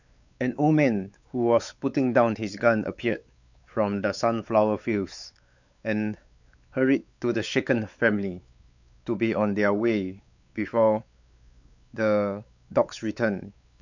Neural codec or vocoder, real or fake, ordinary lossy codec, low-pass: codec, 16 kHz, 4 kbps, X-Codec, WavLM features, trained on Multilingual LibriSpeech; fake; none; 7.2 kHz